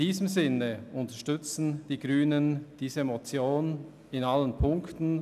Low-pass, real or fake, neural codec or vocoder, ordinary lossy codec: 14.4 kHz; real; none; none